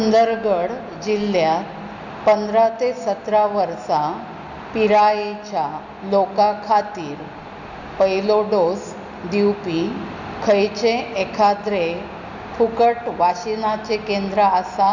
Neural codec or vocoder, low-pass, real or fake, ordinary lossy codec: none; 7.2 kHz; real; none